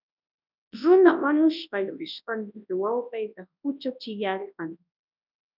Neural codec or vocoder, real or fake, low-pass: codec, 24 kHz, 0.9 kbps, WavTokenizer, large speech release; fake; 5.4 kHz